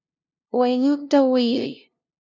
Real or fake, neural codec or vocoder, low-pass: fake; codec, 16 kHz, 0.5 kbps, FunCodec, trained on LibriTTS, 25 frames a second; 7.2 kHz